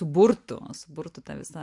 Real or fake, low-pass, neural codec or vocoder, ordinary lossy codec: real; 10.8 kHz; none; MP3, 64 kbps